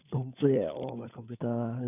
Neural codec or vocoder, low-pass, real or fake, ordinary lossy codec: codec, 16 kHz, 16 kbps, FunCodec, trained on LibriTTS, 50 frames a second; 3.6 kHz; fake; none